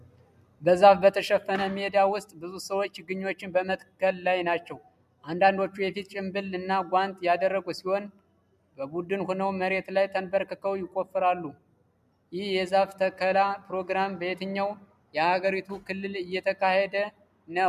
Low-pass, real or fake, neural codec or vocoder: 14.4 kHz; real; none